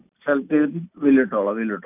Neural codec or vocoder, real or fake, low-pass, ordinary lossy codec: none; real; 3.6 kHz; none